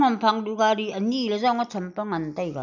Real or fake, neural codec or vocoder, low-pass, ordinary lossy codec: real; none; 7.2 kHz; none